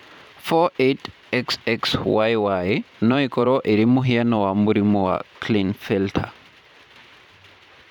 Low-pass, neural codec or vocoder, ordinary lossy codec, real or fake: 19.8 kHz; vocoder, 44.1 kHz, 128 mel bands every 512 samples, BigVGAN v2; none; fake